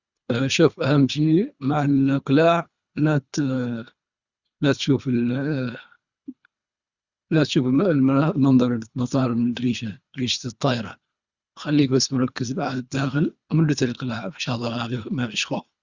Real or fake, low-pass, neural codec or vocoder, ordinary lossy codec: fake; 7.2 kHz; codec, 24 kHz, 3 kbps, HILCodec; Opus, 64 kbps